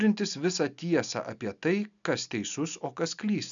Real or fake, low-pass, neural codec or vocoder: real; 7.2 kHz; none